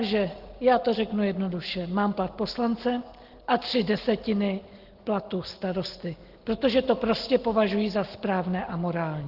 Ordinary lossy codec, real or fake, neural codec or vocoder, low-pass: Opus, 16 kbps; real; none; 5.4 kHz